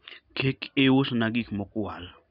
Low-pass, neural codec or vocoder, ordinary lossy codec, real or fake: 5.4 kHz; none; none; real